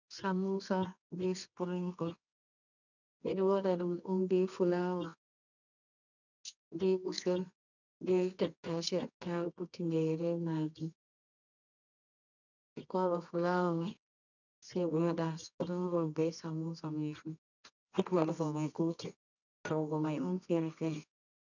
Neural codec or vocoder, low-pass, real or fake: codec, 24 kHz, 0.9 kbps, WavTokenizer, medium music audio release; 7.2 kHz; fake